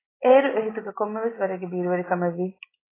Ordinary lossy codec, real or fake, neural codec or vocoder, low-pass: AAC, 16 kbps; real; none; 3.6 kHz